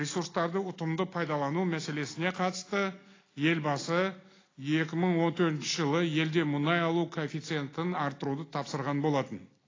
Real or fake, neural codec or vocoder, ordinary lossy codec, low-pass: real; none; AAC, 32 kbps; 7.2 kHz